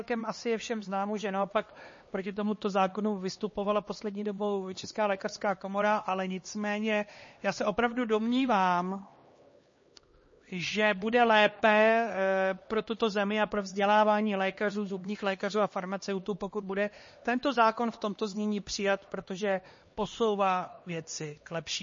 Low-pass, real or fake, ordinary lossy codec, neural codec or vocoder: 7.2 kHz; fake; MP3, 32 kbps; codec, 16 kHz, 2 kbps, X-Codec, HuBERT features, trained on LibriSpeech